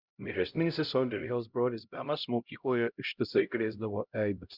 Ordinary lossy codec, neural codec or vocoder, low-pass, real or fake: Opus, 64 kbps; codec, 16 kHz, 0.5 kbps, X-Codec, HuBERT features, trained on LibriSpeech; 5.4 kHz; fake